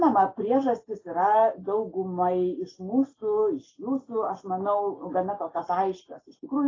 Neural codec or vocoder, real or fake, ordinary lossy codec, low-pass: none; real; AAC, 32 kbps; 7.2 kHz